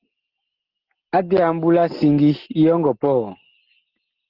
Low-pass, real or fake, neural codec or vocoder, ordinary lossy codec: 5.4 kHz; real; none; Opus, 16 kbps